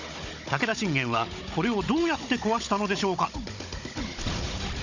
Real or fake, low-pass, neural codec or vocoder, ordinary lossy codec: fake; 7.2 kHz; codec, 16 kHz, 16 kbps, FunCodec, trained on Chinese and English, 50 frames a second; none